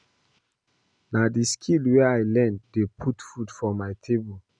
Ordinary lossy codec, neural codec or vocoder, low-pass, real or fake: none; none; 9.9 kHz; real